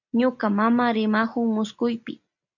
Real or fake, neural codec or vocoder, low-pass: real; none; 7.2 kHz